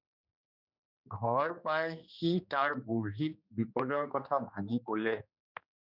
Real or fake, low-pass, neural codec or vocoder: fake; 5.4 kHz; codec, 16 kHz, 2 kbps, X-Codec, HuBERT features, trained on general audio